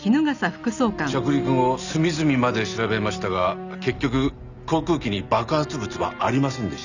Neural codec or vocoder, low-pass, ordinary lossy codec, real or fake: none; 7.2 kHz; none; real